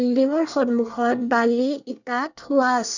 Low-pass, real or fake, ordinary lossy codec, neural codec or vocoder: 7.2 kHz; fake; none; codec, 24 kHz, 1 kbps, SNAC